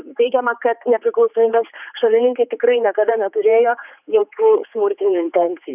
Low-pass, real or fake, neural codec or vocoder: 3.6 kHz; fake; codec, 16 kHz, 4 kbps, X-Codec, HuBERT features, trained on general audio